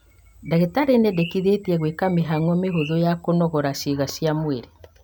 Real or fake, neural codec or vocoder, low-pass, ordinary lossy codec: real; none; none; none